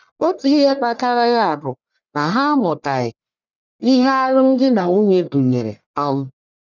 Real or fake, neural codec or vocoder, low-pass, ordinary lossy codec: fake; codec, 44.1 kHz, 1.7 kbps, Pupu-Codec; 7.2 kHz; none